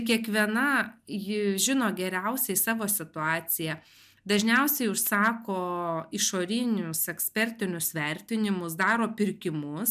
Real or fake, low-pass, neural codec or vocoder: real; 14.4 kHz; none